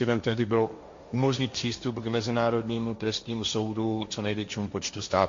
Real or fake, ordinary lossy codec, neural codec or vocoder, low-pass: fake; MP3, 48 kbps; codec, 16 kHz, 1.1 kbps, Voila-Tokenizer; 7.2 kHz